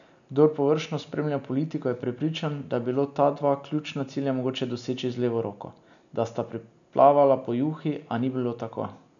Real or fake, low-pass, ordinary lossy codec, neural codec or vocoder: real; 7.2 kHz; none; none